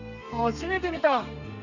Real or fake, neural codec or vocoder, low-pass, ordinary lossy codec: fake; codec, 32 kHz, 1.9 kbps, SNAC; 7.2 kHz; none